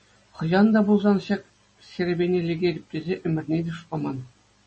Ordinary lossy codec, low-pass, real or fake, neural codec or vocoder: MP3, 32 kbps; 10.8 kHz; real; none